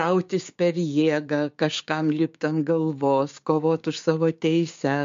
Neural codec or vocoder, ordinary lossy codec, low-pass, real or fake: codec, 16 kHz, 6 kbps, DAC; MP3, 48 kbps; 7.2 kHz; fake